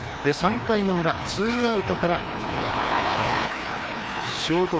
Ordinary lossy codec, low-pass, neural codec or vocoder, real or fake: none; none; codec, 16 kHz, 2 kbps, FreqCodec, larger model; fake